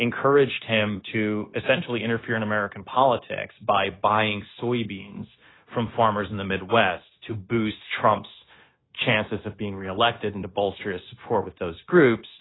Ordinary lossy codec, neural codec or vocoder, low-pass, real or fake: AAC, 16 kbps; codec, 16 kHz, 0.9 kbps, LongCat-Audio-Codec; 7.2 kHz; fake